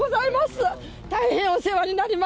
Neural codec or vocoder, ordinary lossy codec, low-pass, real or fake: none; none; none; real